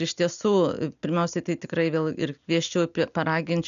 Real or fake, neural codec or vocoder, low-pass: real; none; 7.2 kHz